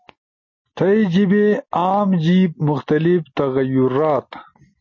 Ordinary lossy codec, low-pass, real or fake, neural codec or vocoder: MP3, 32 kbps; 7.2 kHz; real; none